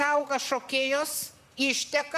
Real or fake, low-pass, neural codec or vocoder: fake; 14.4 kHz; vocoder, 44.1 kHz, 128 mel bands, Pupu-Vocoder